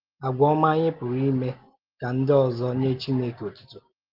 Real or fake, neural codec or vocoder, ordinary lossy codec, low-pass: real; none; Opus, 16 kbps; 5.4 kHz